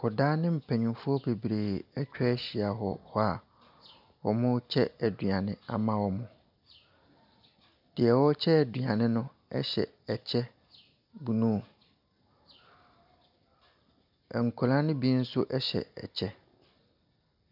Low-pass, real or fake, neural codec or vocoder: 5.4 kHz; real; none